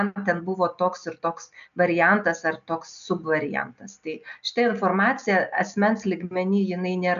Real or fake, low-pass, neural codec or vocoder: real; 7.2 kHz; none